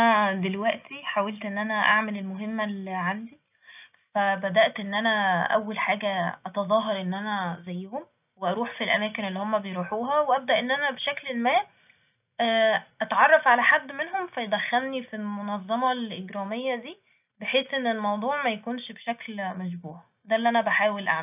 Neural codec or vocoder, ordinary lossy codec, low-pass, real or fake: none; none; 3.6 kHz; real